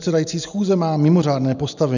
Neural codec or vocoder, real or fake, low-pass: none; real; 7.2 kHz